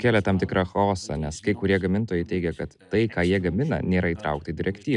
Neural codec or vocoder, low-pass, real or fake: none; 10.8 kHz; real